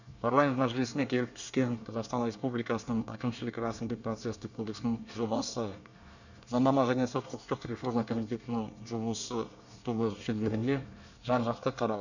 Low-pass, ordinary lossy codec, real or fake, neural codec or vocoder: 7.2 kHz; none; fake; codec, 24 kHz, 1 kbps, SNAC